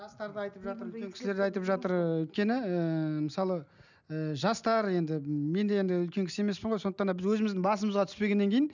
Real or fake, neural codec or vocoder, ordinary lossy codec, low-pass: real; none; none; 7.2 kHz